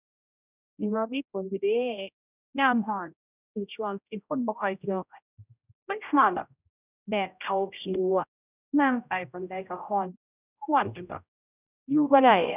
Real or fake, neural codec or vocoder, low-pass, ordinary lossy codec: fake; codec, 16 kHz, 0.5 kbps, X-Codec, HuBERT features, trained on general audio; 3.6 kHz; none